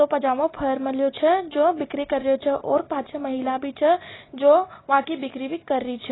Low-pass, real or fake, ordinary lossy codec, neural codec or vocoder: 7.2 kHz; real; AAC, 16 kbps; none